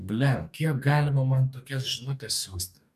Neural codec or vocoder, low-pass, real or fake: codec, 44.1 kHz, 2.6 kbps, DAC; 14.4 kHz; fake